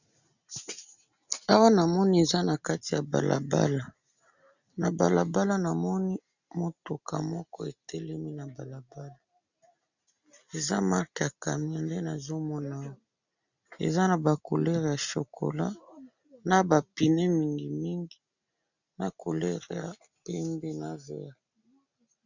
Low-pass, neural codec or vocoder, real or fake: 7.2 kHz; none; real